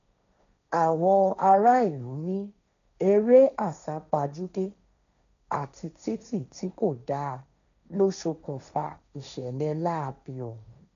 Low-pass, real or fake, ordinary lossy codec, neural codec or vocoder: 7.2 kHz; fake; none; codec, 16 kHz, 1.1 kbps, Voila-Tokenizer